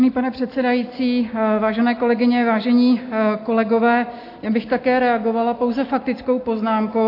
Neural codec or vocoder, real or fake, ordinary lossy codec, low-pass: none; real; AAC, 32 kbps; 5.4 kHz